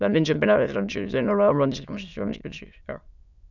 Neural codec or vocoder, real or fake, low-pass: autoencoder, 22.05 kHz, a latent of 192 numbers a frame, VITS, trained on many speakers; fake; 7.2 kHz